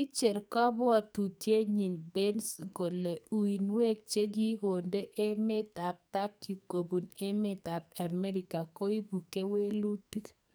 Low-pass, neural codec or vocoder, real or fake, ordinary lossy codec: none; codec, 44.1 kHz, 2.6 kbps, SNAC; fake; none